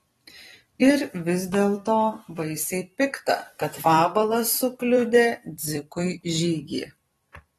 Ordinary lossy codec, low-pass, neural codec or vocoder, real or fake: AAC, 32 kbps; 19.8 kHz; vocoder, 44.1 kHz, 128 mel bands every 256 samples, BigVGAN v2; fake